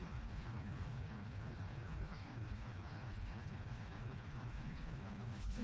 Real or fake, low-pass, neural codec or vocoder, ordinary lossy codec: fake; none; codec, 16 kHz, 2 kbps, FreqCodec, smaller model; none